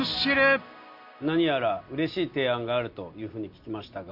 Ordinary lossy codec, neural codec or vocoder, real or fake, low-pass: Opus, 64 kbps; none; real; 5.4 kHz